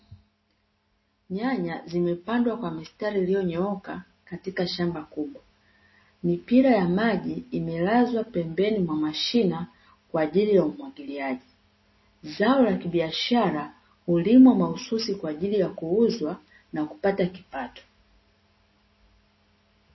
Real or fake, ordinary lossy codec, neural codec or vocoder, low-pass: real; MP3, 24 kbps; none; 7.2 kHz